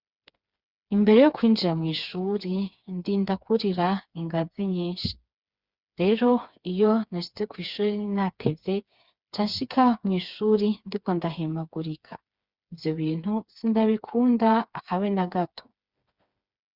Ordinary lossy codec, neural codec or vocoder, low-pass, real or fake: Opus, 64 kbps; codec, 16 kHz, 4 kbps, FreqCodec, smaller model; 5.4 kHz; fake